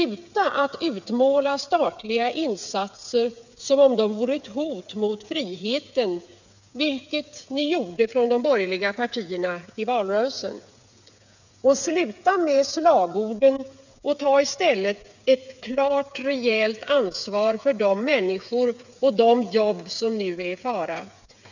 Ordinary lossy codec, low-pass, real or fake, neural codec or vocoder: none; 7.2 kHz; fake; codec, 16 kHz, 8 kbps, FreqCodec, smaller model